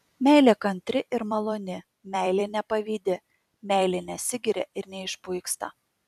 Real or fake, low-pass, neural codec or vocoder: fake; 14.4 kHz; vocoder, 44.1 kHz, 128 mel bands every 256 samples, BigVGAN v2